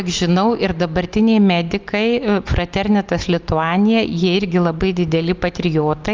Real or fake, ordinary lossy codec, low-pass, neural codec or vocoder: real; Opus, 32 kbps; 7.2 kHz; none